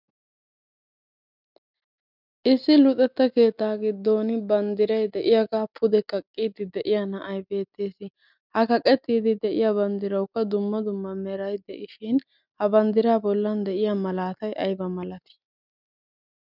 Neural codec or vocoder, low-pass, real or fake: none; 5.4 kHz; real